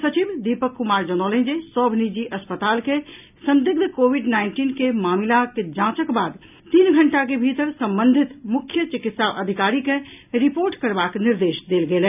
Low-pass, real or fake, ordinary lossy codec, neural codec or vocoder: 3.6 kHz; real; none; none